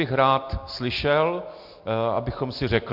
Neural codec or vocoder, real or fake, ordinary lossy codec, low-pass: none; real; MP3, 48 kbps; 5.4 kHz